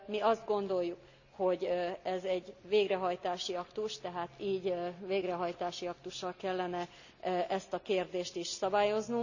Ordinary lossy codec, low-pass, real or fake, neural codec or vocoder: none; 7.2 kHz; real; none